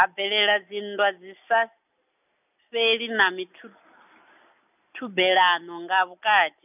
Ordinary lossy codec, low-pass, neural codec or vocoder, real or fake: none; 3.6 kHz; none; real